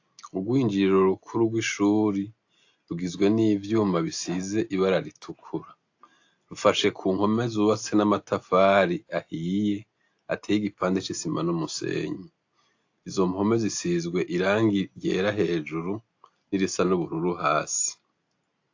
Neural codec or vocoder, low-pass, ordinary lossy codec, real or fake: none; 7.2 kHz; AAC, 48 kbps; real